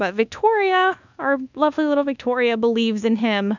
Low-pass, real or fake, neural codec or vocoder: 7.2 kHz; fake; codec, 24 kHz, 1.2 kbps, DualCodec